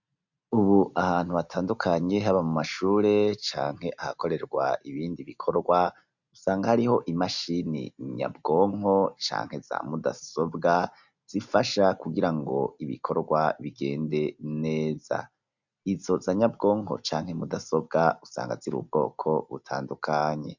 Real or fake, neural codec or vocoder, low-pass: real; none; 7.2 kHz